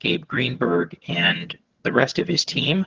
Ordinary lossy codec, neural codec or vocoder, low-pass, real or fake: Opus, 16 kbps; vocoder, 22.05 kHz, 80 mel bands, HiFi-GAN; 7.2 kHz; fake